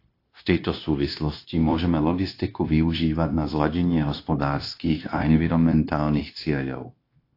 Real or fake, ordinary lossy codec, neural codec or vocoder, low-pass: fake; AAC, 32 kbps; codec, 16 kHz, 0.9 kbps, LongCat-Audio-Codec; 5.4 kHz